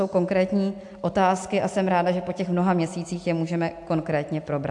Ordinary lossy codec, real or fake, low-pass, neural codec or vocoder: AAC, 64 kbps; real; 10.8 kHz; none